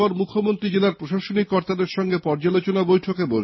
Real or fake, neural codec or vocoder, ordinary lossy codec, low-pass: real; none; MP3, 24 kbps; 7.2 kHz